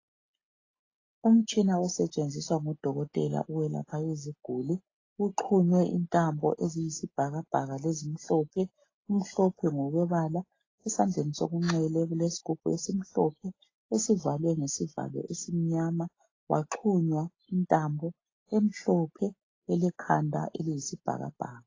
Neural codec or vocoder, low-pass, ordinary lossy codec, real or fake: none; 7.2 kHz; AAC, 32 kbps; real